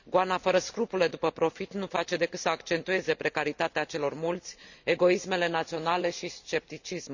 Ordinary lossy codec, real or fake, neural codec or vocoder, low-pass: none; real; none; 7.2 kHz